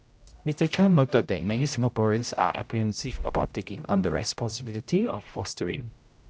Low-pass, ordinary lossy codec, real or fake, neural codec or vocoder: none; none; fake; codec, 16 kHz, 0.5 kbps, X-Codec, HuBERT features, trained on general audio